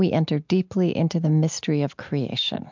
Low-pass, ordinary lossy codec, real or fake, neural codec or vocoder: 7.2 kHz; MP3, 64 kbps; real; none